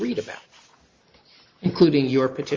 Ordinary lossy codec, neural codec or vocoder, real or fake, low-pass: Opus, 32 kbps; none; real; 7.2 kHz